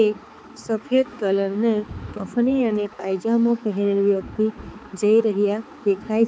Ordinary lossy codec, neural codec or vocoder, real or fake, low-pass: none; codec, 16 kHz, 4 kbps, X-Codec, HuBERT features, trained on balanced general audio; fake; none